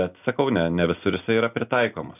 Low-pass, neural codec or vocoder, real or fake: 3.6 kHz; none; real